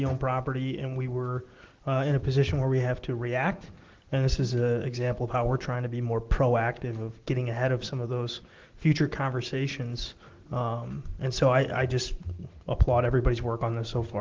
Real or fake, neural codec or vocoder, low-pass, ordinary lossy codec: real; none; 7.2 kHz; Opus, 16 kbps